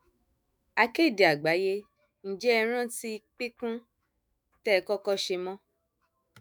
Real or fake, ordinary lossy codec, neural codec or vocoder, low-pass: fake; none; autoencoder, 48 kHz, 128 numbers a frame, DAC-VAE, trained on Japanese speech; none